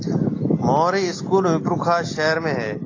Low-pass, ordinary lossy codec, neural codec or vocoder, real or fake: 7.2 kHz; AAC, 32 kbps; none; real